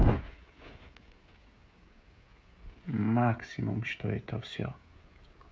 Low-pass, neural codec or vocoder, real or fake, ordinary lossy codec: none; codec, 16 kHz, 16 kbps, FreqCodec, smaller model; fake; none